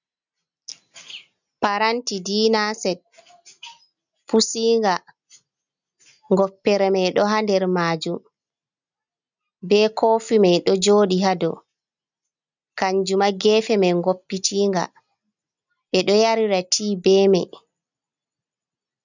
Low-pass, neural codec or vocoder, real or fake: 7.2 kHz; none; real